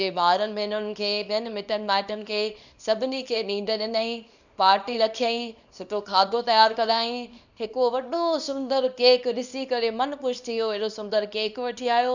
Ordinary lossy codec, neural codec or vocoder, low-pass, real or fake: none; codec, 24 kHz, 0.9 kbps, WavTokenizer, small release; 7.2 kHz; fake